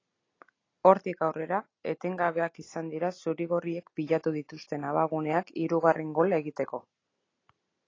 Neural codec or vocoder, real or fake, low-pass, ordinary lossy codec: none; real; 7.2 kHz; AAC, 32 kbps